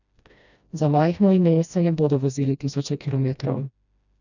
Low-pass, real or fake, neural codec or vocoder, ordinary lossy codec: 7.2 kHz; fake; codec, 16 kHz, 1 kbps, FreqCodec, smaller model; none